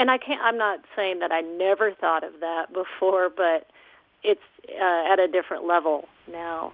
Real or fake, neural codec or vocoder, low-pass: real; none; 5.4 kHz